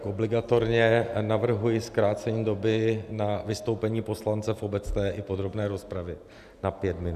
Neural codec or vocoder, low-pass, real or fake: none; 14.4 kHz; real